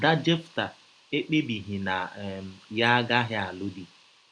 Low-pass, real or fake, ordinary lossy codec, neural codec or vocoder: 9.9 kHz; real; none; none